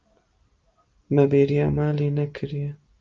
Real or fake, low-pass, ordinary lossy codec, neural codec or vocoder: real; 7.2 kHz; Opus, 24 kbps; none